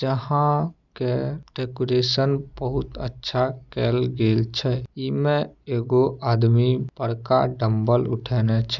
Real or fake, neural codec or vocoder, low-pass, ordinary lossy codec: real; none; 7.2 kHz; none